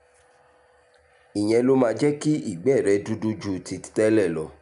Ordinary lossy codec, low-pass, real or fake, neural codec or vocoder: none; 10.8 kHz; real; none